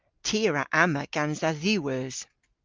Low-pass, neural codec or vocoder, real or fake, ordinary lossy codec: 7.2 kHz; none; real; Opus, 24 kbps